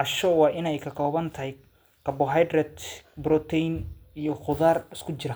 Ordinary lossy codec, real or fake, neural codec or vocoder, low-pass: none; real; none; none